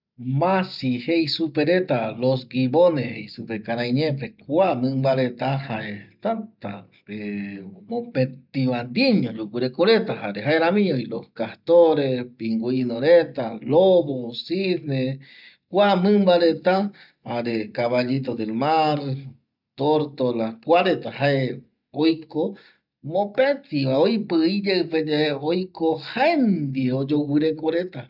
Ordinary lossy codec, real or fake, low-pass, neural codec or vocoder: none; real; 5.4 kHz; none